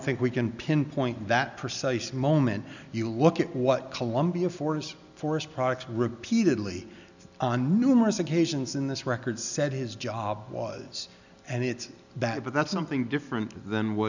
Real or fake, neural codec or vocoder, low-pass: real; none; 7.2 kHz